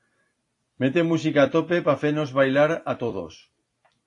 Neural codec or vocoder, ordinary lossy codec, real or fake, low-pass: vocoder, 44.1 kHz, 128 mel bands every 256 samples, BigVGAN v2; AAC, 48 kbps; fake; 10.8 kHz